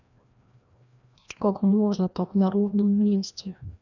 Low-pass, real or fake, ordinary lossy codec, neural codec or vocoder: 7.2 kHz; fake; none; codec, 16 kHz, 1 kbps, FreqCodec, larger model